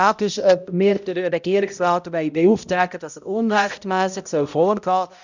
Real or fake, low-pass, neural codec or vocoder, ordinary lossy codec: fake; 7.2 kHz; codec, 16 kHz, 0.5 kbps, X-Codec, HuBERT features, trained on balanced general audio; none